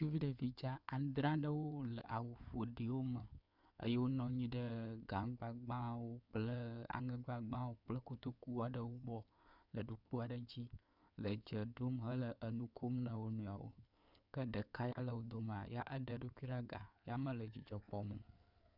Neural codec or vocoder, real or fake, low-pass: codec, 16 kHz in and 24 kHz out, 2.2 kbps, FireRedTTS-2 codec; fake; 5.4 kHz